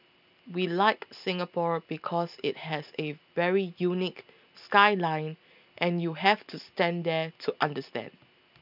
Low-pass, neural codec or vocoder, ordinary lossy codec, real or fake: 5.4 kHz; none; none; real